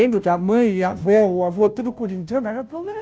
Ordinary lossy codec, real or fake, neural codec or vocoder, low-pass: none; fake; codec, 16 kHz, 0.5 kbps, FunCodec, trained on Chinese and English, 25 frames a second; none